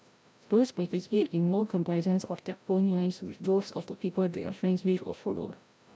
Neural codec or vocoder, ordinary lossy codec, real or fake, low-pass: codec, 16 kHz, 0.5 kbps, FreqCodec, larger model; none; fake; none